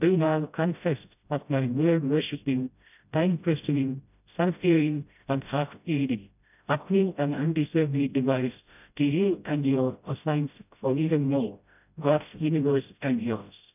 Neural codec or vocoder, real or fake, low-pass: codec, 16 kHz, 0.5 kbps, FreqCodec, smaller model; fake; 3.6 kHz